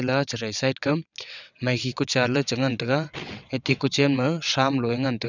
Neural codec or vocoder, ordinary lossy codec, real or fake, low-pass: vocoder, 22.05 kHz, 80 mel bands, WaveNeXt; none; fake; 7.2 kHz